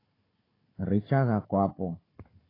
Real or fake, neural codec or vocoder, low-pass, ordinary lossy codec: fake; codec, 16 kHz, 16 kbps, FunCodec, trained on Chinese and English, 50 frames a second; 5.4 kHz; AAC, 24 kbps